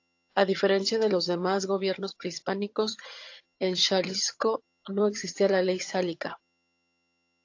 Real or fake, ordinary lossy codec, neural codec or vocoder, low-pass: fake; AAC, 48 kbps; vocoder, 22.05 kHz, 80 mel bands, HiFi-GAN; 7.2 kHz